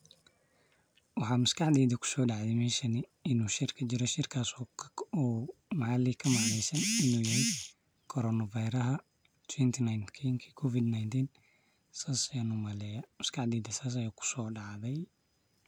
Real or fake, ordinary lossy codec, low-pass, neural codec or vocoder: real; none; none; none